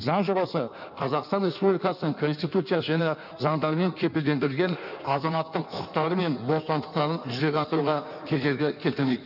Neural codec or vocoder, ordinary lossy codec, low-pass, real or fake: codec, 16 kHz in and 24 kHz out, 1.1 kbps, FireRedTTS-2 codec; none; 5.4 kHz; fake